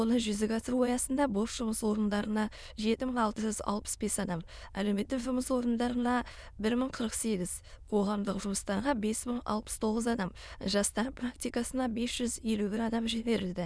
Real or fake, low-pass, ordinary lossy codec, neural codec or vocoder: fake; none; none; autoencoder, 22.05 kHz, a latent of 192 numbers a frame, VITS, trained on many speakers